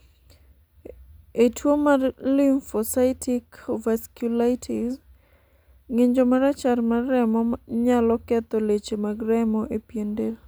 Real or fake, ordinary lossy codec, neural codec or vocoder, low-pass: real; none; none; none